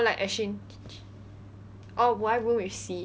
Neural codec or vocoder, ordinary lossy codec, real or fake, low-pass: none; none; real; none